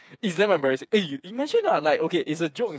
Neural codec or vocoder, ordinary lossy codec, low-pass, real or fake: codec, 16 kHz, 4 kbps, FreqCodec, smaller model; none; none; fake